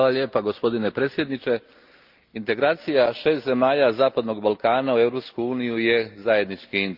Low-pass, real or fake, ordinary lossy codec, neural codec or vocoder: 5.4 kHz; real; Opus, 32 kbps; none